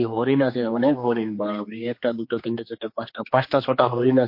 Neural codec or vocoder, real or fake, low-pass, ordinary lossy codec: codec, 16 kHz, 2 kbps, X-Codec, HuBERT features, trained on general audio; fake; 5.4 kHz; MP3, 32 kbps